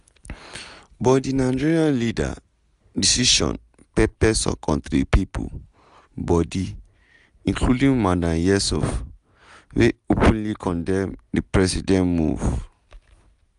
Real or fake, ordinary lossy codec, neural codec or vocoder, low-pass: real; none; none; 10.8 kHz